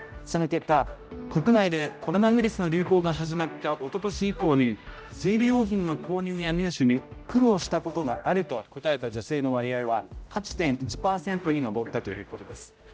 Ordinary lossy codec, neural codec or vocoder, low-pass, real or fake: none; codec, 16 kHz, 0.5 kbps, X-Codec, HuBERT features, trained on general audio; none; fake